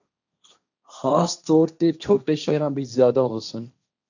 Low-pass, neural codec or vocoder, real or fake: 7.2 kHz; codec, 16 kHz, 1.1 kbps, Voila-Tokenizer; fake